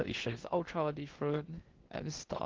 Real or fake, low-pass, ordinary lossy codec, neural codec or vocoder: fake; 7.2 kHz; Opus, 16 kbps; codec, 16 kHz in and 24 kHz out, 0.6 kbps, FocalCodec, streaming, 2048 codes